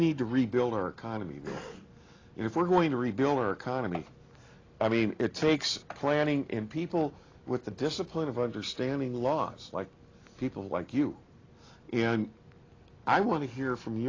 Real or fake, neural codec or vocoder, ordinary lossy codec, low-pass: real; none; AAC, 32 kbps; 7.2 kHz